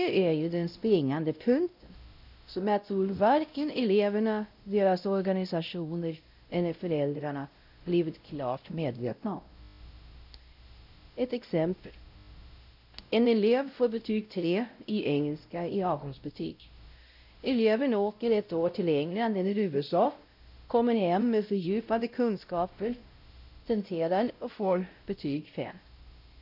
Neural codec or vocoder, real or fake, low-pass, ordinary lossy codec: codec, 16 kHz, 0.5 kbps, X-Codec, WavLM features, trained on Multilingual LibriSpeech; fake; 5.4 kHz; none